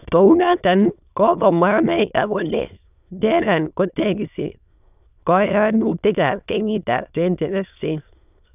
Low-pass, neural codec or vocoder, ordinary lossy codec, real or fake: 3.6 kHz; autoencoder, 22.05 kHz, a latent of 192 numbers a frame, VITS, trained on many speakers; none; fake